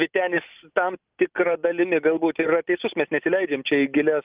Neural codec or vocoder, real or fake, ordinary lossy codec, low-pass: none; real; Opus, 32 kbps; 3.6 kHz